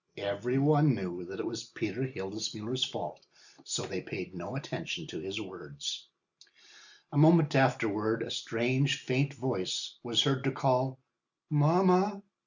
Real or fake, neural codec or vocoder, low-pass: real; none; 7.2 kHz